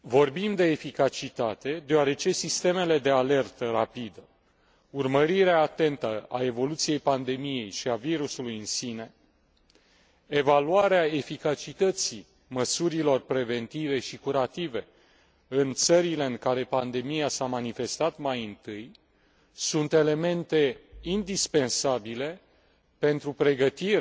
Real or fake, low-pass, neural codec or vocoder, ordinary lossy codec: real; none; none; none